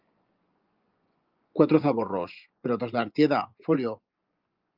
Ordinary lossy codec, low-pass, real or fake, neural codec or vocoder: Opus, 24 kbps; 5.4 kHz; fake; vocoder, 24 kHz, 100 mel bands, Vocos